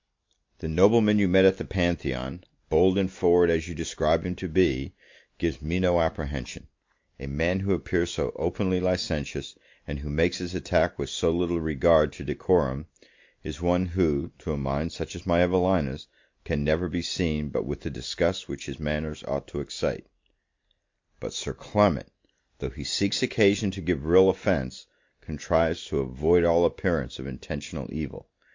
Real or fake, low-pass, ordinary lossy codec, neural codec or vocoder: real; 7.2 kHz; MP3, 48 kbps; none